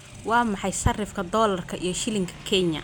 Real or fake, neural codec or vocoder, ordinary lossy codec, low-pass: real; none; none; none